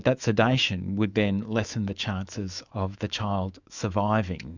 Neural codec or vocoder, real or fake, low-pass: autoencoder, 48 kHz, 128 numbers a frame, DAC-VAE, trained on Japanese speech; fake; 7.2 kHz